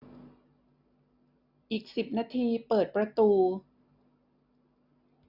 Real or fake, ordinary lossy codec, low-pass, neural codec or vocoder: real; none; 5.4 kHz; none